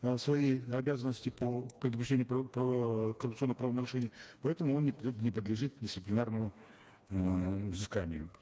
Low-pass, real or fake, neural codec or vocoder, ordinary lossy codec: none; fake; codec, 16 kHz, 2 kbps, FreqCodec, smaller model; none